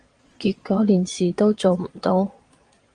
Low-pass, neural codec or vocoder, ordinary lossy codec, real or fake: 9.9 kHz; vocoder, 22.05 kHz, 80 mel bands, Vocos; Opus, 32 kbps; fake